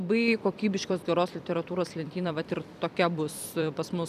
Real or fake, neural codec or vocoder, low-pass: fake; vocoder, 44.1 kHz, 128 mel bands every 256 samples, BigVGAN v2; 14.4 kHz